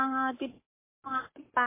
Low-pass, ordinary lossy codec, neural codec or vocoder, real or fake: 3.6 kHz; MP3, 24 kbps; codec, 44.1 kHz, 7.8 kbps, Pupu-Codec; fake